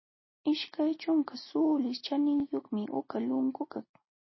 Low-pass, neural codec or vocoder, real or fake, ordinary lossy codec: 7.2 kHz; none; real; MP3, 24 kbps